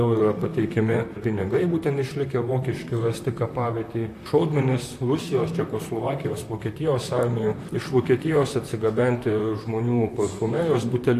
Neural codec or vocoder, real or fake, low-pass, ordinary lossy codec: vocoder, 44.1 kHz, 128 mel bands, Pupu-Vocoder; fake; 14.4 kHz; AAC, 64 kbps